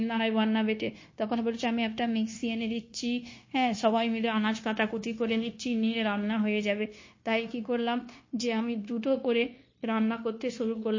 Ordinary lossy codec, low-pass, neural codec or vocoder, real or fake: MP3, 32 kbps; 7.2 kHz; codec, 16 kHz, 0.9 kbps, LongCat-Audio-Codec; fake